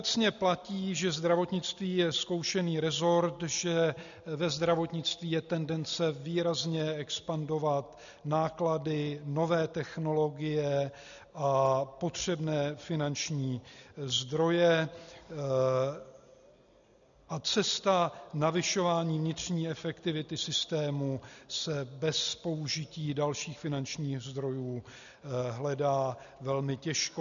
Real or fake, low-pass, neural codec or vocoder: real; 7.2 kHz; none